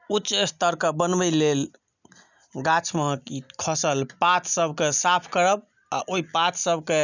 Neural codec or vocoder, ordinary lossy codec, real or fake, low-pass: none; none; real; 7.2 kHz